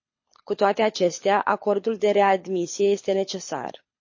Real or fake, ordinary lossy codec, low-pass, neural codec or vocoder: fake; MP3, 32 kbps; 7.2 kHz; codec, 24 kHz, 6 kbps, HILCodec